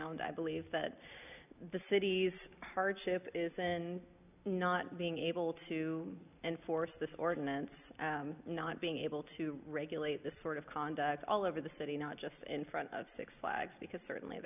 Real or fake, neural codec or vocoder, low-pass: real; none; 3.6 kHz